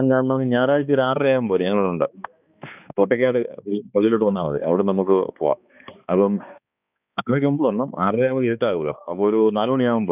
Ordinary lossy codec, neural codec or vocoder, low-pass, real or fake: none; codec, 16 kHz, 2 kbps, X-Codec, HuBERT features, trained on balanced general audio; 3.6 kHz; fake